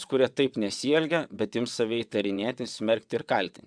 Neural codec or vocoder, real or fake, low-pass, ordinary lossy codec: vocoder, 22.05 kHz, 80 mel bands, WaveNeXt; fake; 9.9 kHz; MP3, 96 kbps